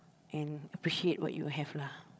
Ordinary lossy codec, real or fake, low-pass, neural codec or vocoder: none; fake; none; codec, 16 kHz, 16 kbps, FreqCodec, larger model